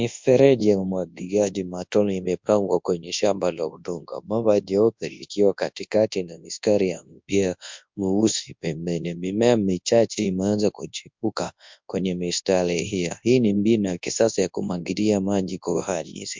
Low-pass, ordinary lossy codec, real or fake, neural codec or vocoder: 7.2 kHz; MP3, 64 kbps; fake; codec, 24 kHz, 0.9 kbps, WavTokenizer, large speech release